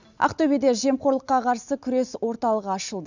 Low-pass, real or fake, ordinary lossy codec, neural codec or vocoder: 7.2 kHz; real; none; none